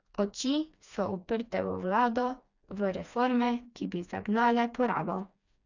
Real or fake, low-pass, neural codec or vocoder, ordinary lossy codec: fake; 7.2 kHz; codec, 16 kHz, 2 kbps, FreqCodec, smaller model; none